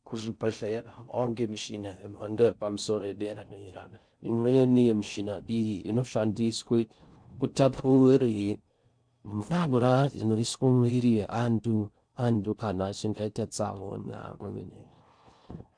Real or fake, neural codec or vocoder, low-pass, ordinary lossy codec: fake; codec, 16 kHz in and 24 kHz out, 0.6 kbps, FocalCodec, streaming, 4096 codes; 9.9 kHz; MP3, 96 kbps